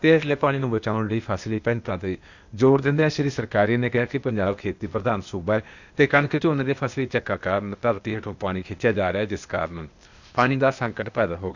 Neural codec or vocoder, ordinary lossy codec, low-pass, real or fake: codec, 16 kHz, 0.8 kbps, ZipCodec; none; 7.2 kHz; fake